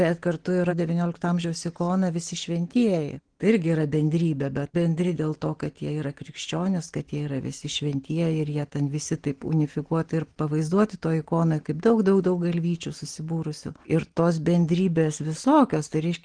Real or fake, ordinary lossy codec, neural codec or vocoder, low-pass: real; Opus, 16 kbps; none; 9.9 kHz